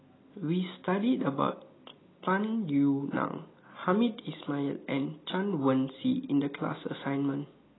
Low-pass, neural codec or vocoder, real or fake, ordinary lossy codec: 7.2 kHz; none; real; AAC, 16 kbps